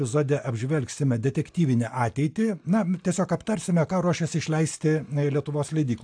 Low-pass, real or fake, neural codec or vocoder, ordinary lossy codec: 9.9 kHz; real; none; AAC, 64 kbps